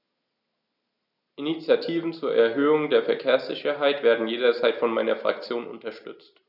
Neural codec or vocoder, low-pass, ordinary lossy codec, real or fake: none; 5.4 kHz; none; real